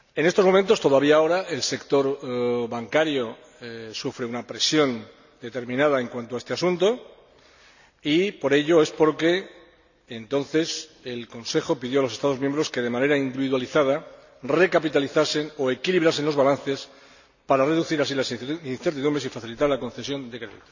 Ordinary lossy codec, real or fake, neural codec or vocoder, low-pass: none; real; none; 7.2 kHz